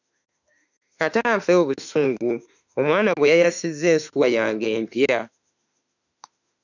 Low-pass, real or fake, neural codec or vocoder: 7.2 kHz; fake; autoencoder, 48 kHz, 32 numbers a frame, DAC-VAE, trained on Japanese speech